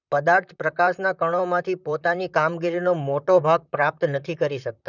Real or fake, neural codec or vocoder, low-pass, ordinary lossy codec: fake; vocoder, 44.1 kHz, 128 mel bands, Pupu-Vocoder; 7.2 kHz; none